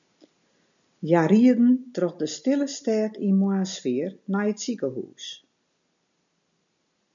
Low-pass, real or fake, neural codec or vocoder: 7.2 kHz; real; none